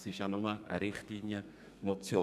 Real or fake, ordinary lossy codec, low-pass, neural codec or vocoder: fake; none; 14.4 kHz; codec, 32 kHz, 1.9 kbps, SNAC